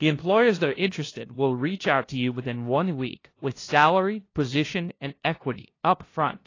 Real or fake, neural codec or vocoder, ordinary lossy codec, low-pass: fake; codec, 16 kHz, 0.5 kbps, FunCodec, trained on LibriTTS, 25 frames a second; AAC, 32 kbps; 7.2 kHz